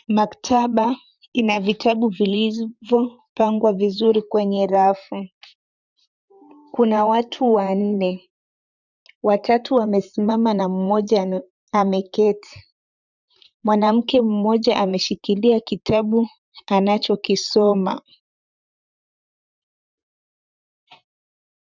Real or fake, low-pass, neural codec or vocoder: fake; 7.2 kHz; vocoder, 44.1 kHz, 128 mel bands, Pupu-Vocoder